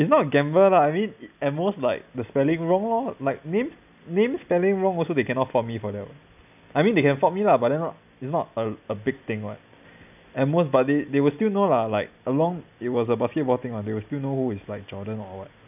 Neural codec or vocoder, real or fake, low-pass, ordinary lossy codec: none; real; 3.6 kHz; none